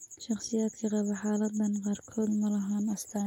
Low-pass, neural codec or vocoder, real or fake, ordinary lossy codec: 19.8 kHz; none; real; none